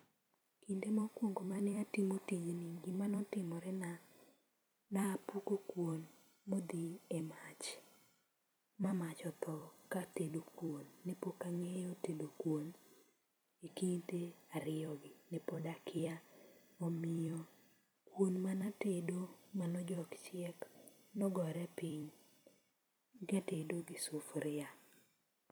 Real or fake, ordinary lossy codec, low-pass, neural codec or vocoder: fake; none; none; vocoder, 44.1 kHz, 128 mel bands every 256 samples, BigVGAN v2